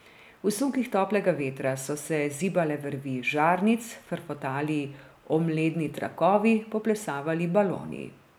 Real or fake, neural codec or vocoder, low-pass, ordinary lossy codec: real; none; none; none